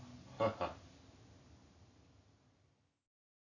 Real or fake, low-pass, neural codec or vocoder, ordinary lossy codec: real; 7.2 kHz; none; none